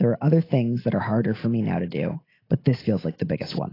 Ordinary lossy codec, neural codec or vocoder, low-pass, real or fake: AAC, 24 kbps; none; 5.4 kHz; real